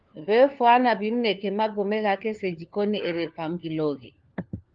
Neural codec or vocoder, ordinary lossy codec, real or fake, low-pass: codec, 16 kHz, 4 kbps, FunCodec, trained on LibriTTS, 50 frames a second; Opus, 32 kbps; fake; 7.2 kHz